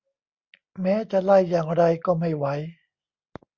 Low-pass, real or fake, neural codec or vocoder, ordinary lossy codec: 7.2 kHz; real; none; Opus, 64 kbps